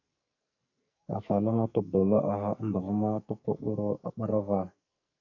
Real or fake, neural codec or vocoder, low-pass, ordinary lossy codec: fake; codec, 44.1 kHz, 2.6 kbps, SNAC; 7.2 kHz; AAC, 48 kbps